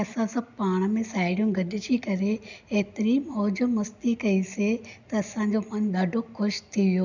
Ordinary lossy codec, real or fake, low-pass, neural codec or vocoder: none; real; 7.2 kHz; none